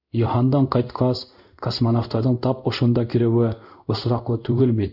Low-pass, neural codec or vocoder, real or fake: 5.4 kHz; codec, 16 kHz in and 24 kHz out, 1 kbps, XY-Tokenizer; fake